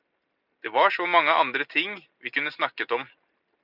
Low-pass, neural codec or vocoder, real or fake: 5.4 kHz; none; real